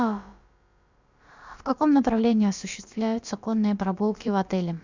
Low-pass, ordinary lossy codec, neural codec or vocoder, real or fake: 7.2 kHz; Opus, 64 kbps; codec, 16 kHz, about 1 kbps, DyCAST, with the encoder's durations; fake